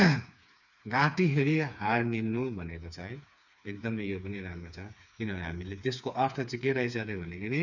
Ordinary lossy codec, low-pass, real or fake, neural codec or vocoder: none; 7.2 kHz; fake; codec, 16 kHz, 4 kbps, FreqCodec, smaller model